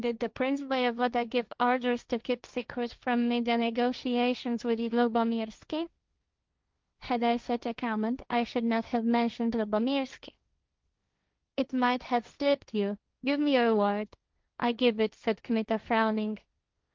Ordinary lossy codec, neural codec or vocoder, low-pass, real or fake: Opus, 24 kbps; codec, 16 kHz, 1.1 kbps, Voila-Tokenizer; 7.2 kHz; fake